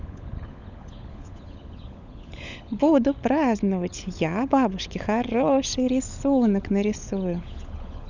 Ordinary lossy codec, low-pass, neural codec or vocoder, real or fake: none; 7.2 kHz; codec, 16 kHz, 16 kbps, FunCodec, trained on LibriTTS, 50 frames a second; fake